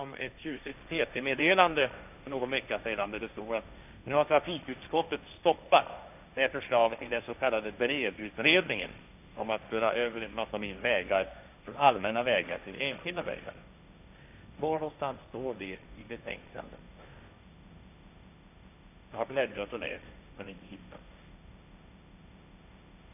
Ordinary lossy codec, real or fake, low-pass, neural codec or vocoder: none; fake; 3.6 kHz; codec, 16 kHz, 1.1 kbps, Voila-Tokenizer